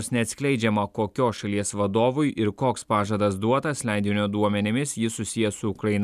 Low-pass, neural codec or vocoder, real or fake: 14.4 kHz; none; real